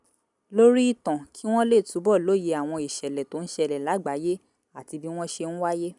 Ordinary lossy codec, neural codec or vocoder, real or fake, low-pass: none; none; real; 10.8 kHz